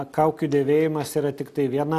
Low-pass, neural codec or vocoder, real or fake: 14.4 kHz; none; real